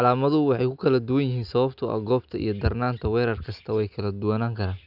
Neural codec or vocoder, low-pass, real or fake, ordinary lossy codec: none; 5.4 kHz; real; none